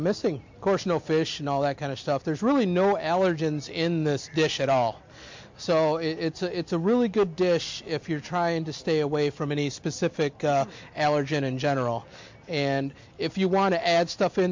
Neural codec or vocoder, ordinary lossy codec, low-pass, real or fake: none; MP3, 48 kbps; 7.2 kHz; real